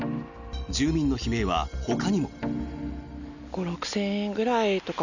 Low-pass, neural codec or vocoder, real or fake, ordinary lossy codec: 7.2 kHz; none; real; none